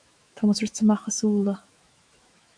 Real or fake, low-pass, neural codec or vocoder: fake; 9.9 kHz; autoencoder, 48 kHz, 128 numbers a frame, DAC-VAE, trained on Japanese speech